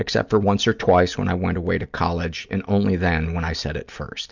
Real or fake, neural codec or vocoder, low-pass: real; none; 7.2 kHz